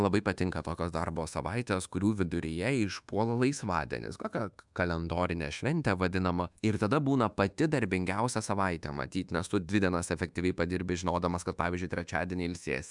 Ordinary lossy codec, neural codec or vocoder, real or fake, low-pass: MP3, 96 kbps; codec, 24 kHz, 1.2 kbps, DualCodec; fake; 10.8 kHz